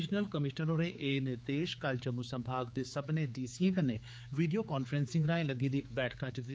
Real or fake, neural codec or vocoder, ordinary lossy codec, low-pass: fake; codec, 16 kHz, 4 kbps, X-Codec, HuBERT features, trained on general audio; none; none